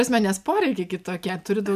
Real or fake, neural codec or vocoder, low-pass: real; none; 14.4 kHz